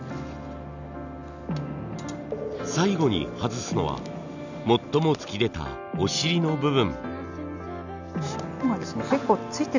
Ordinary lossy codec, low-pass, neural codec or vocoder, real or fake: none; 7.2 kHz; none; real